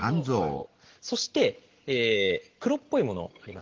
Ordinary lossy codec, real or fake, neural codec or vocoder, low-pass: Opus, 16 kbps; real; none; 7.2 kHz